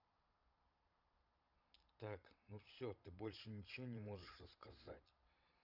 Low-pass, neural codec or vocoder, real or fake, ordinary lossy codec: 5.4 kHz; vocoder, 22.05 kHz, 80 mel bands, Vocos; fake; none